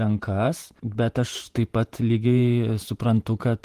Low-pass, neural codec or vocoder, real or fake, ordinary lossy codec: 14.4 kHz; vocoder, 48 kHz, 128 mel bands, Vocos; fake; Opus, 24 kbps